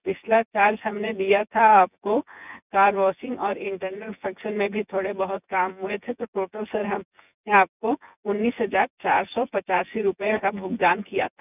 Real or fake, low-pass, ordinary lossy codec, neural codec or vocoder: fake; 3.6 kHz; none; vocoder, 24 kHz, 100 mel bands, Vocos